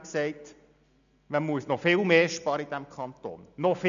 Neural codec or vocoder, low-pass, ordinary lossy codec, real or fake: none; 7.2 kHz; AAC, 96 kbps; real